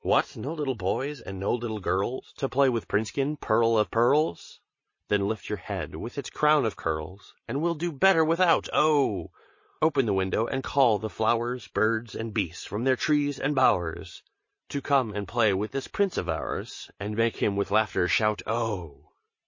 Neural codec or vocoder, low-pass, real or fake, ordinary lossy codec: none; 7.2 kHz; real; MP3, 32 kbps